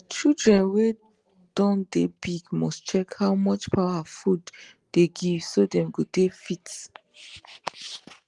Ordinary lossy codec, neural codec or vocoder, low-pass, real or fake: Opus, 24 kbps; none; 10.8 kHz; real